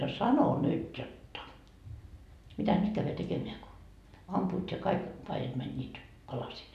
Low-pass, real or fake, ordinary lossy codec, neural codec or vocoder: 14.4 kHz; real; none; none